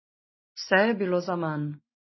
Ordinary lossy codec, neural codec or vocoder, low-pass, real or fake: MP3, 24 kbps; none; 7.2 kHz; real